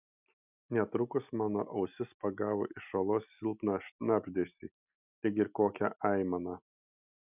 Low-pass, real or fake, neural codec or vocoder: 3.6 kHz; real; none